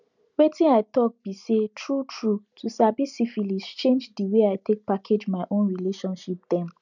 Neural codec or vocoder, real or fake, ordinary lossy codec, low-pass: none; real; none; 7.2 kHz